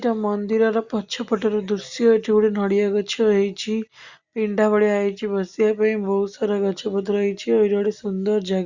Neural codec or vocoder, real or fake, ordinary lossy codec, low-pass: none; real; Opus, 64 kbps; 7.2 kHz